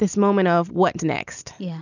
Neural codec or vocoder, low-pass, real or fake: none; 7.2 kHz; real